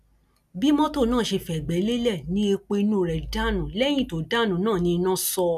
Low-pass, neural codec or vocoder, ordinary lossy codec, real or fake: 14.4 kHz; none; none; real